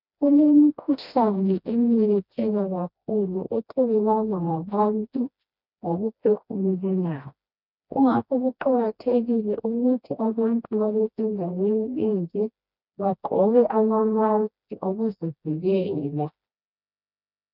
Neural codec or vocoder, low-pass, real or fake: codec, 16 kHz, 1 kbps, FreqCodec, smaller model; 5.4 kHz; fake